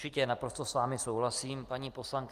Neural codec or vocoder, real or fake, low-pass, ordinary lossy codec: none; real; 14.4 kHz; Opus, 24 kbps